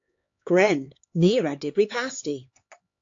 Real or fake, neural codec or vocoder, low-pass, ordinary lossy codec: fake; codec, 16 kHz, 4 kbps, X-Codec, HuBERT features, trained on LibriSpeech; 7.2 kHz; AAC, 48 kbps